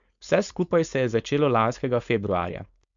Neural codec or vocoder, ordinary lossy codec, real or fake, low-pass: codec, 16 kHz, 4.8 kbps, FACodec; MP3, 64 kbps; fake; 7.2 kHz